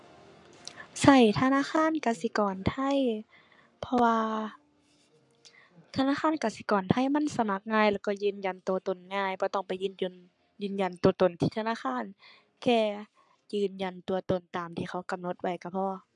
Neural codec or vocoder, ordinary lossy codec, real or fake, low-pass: codec, 44.1 kHz, 7.8 kbps, Pupu-Codec; none; fake; 10.8 kHz